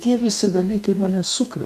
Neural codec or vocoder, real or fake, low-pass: codec, 44.1 kHz, 2.6 kbps, DAC; fake; 14.4 kHz